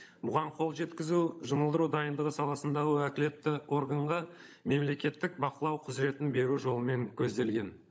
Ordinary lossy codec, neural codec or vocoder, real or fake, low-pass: none; codec, 16 kHz, 16 kbps, FunCodec, trained on LibriTTS, 50 frames a second; fake; none